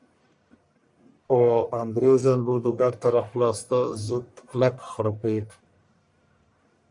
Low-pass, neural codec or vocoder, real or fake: 10.8 kHz; codec, 44.1 kHz, 1.7 kbps, Pupu-Codec; fake